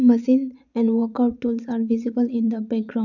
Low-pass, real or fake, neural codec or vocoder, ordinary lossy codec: 7.2 kHz; fake; vocoder, 22.05 kHz, 80 mel bands, Vocos; none